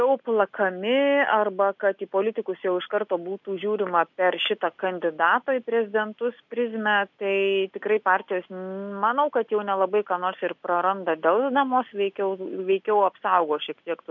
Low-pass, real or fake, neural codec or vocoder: 7.2 kHz; real; none